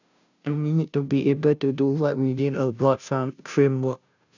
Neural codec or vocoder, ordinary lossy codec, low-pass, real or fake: codec, 16 kHz, 0.5 kbps, FunCodec, trained on Chinese and English, 25 frames a second; none; 7.2 kHz; fake